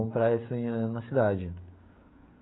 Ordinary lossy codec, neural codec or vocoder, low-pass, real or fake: AAC, 16 kbps; codec, 16 kHz, 16 kbps, FreqCodec, smaller model; 7.2 kHz; fake